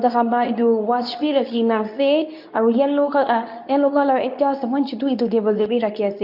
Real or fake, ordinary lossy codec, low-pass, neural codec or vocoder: fake; none; 5.4 kHz; codec, 24 kHz, 0.9 kbps, WavTokenizer, medium speech release version 2